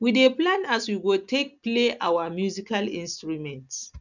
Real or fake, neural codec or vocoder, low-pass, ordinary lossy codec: real; none; 7.2 kHz; none